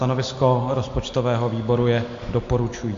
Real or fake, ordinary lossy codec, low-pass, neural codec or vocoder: real; AAC, 48 kbps; 7.2 kHz; none